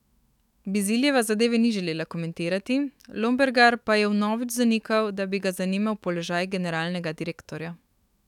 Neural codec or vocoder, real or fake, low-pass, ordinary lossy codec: autoencoder, 48 kHz, 128 numbers a frame, DAC-VAE, trained on Japanese speech; fake; 19.8 kHz; none